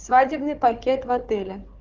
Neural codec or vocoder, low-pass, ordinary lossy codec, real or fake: codec, 16 kHz, 4 kbps, FunCodec, trained on Chinese and English, 50 frames a second; 7.2 kHz; Opus, 32 kbps; fake